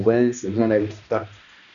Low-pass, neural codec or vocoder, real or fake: 7.2 kHz; codec, 16 kHz, 1 kbps, X-Codec, HuBERT features, trained on balanced general audio; fake